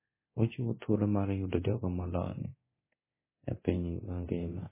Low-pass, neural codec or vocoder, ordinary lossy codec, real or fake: 3.6 kHz; codec, 24 kHz, 0.9 kbps, DualCodec; MP3, 16 kbps; fake